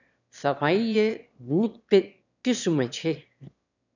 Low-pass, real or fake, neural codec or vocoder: 7.2 kHz; fake; autoencoder, 22.05 kHz, a latent of 192 numbers a frame, VITS, trained on one speaker